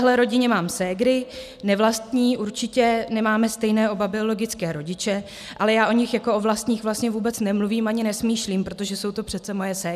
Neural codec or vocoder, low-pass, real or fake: none; 14.4 kHz; real